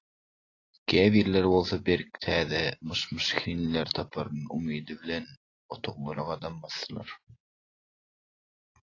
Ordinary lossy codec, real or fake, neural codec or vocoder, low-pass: AAC, 32 kbps; real; none; 7.2 kHz